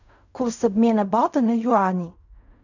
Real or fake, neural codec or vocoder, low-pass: fake; codec, 16 kHz in and 24 kHz out, 0.4 kbps, LongCat-Audio-Codec, fine tuned four codebook decoder; 7.2 kHz